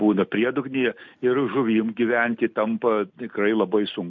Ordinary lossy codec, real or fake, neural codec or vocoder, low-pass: MP3, 48 kbps; real; none; 7.2 kHz